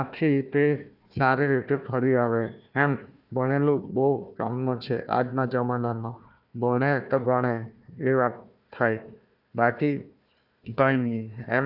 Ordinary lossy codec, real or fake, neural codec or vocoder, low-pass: none; fake; codec, 16 kHz, 1 kbps, FunCodec, trained on Chinese and English, 50 frames a second; 5.4 kHz